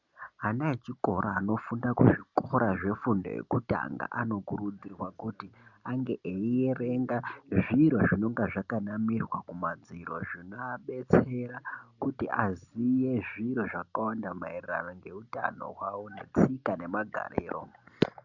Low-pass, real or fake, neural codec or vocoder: 7.2 kHz; real; none